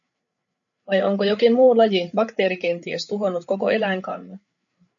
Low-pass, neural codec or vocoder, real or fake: 7.2 kHz; codec, 16 kHz, 8 kbps, FreqCodec, larger model; fake